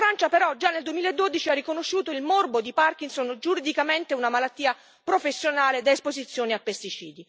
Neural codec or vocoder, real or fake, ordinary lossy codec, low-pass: none; real; none; none